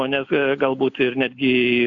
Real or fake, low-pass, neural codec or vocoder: real; 7.2 kHz; none